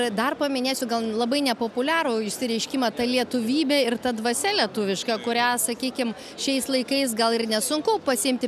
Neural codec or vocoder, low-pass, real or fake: none; 14.4 kHz; real